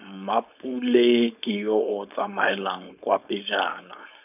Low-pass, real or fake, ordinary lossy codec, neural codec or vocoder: 3.6 kHz; fake; none; codec, 16 kHz, 4.8 kbps, FACodec